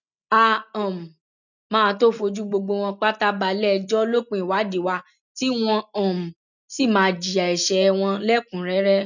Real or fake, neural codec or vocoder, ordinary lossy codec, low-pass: real; none; none; 7.2 kHz